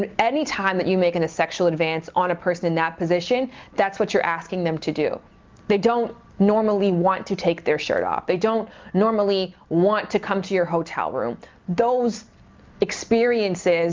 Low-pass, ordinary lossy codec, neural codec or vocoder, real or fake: 7.2 kHz; Opus, 24 kbps; none; real